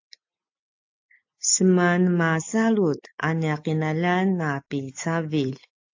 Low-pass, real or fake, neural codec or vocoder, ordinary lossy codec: 7.2 kHz; real; none; AAC, 48 kbps